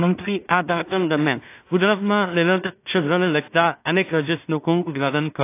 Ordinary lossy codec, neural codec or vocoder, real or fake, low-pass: AAC, 24 kbps; codec, 16 kHz in and 24 kHz out, 0.4 kbps, LongCat-Audio-Codec, two codebook decoder; fake; 3.6 kHz